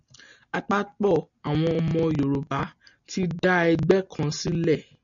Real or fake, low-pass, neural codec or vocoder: real; 7.2 kHz; none